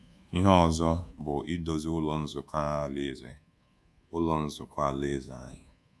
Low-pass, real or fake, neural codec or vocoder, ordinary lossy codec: none; fake; codec, 24 kHz, 1.2 kbps, DualCodec; none